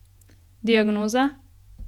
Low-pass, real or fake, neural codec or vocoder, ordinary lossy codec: 19.8 kHz; fake; vocoder, 48 kHz, 128 mel bands, Vocos; none